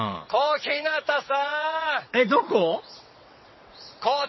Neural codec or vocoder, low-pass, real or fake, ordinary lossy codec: vocoder, 22.05 kHz, 80 mel bands, WaveNeXt; 7.2 kHz; fake; MP3, 24 kbps